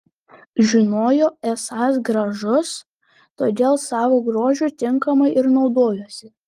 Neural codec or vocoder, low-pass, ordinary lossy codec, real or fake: none; 14.4 kHz; Opus, 24 kbps; real